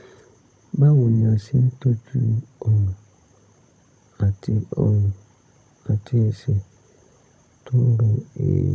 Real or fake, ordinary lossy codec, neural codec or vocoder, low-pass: fake; none; codec, 16 kHz, 16 kbps, FunCodec, trained on Chinese and English, 50 frames a second; none